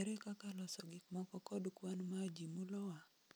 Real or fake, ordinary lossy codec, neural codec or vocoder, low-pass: real; none; none; none